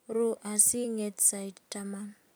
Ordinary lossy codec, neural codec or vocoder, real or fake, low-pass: none; vocoder, 44.1 kHz, 128 mel bands every 256 samples, BigVGAN v2; fake; none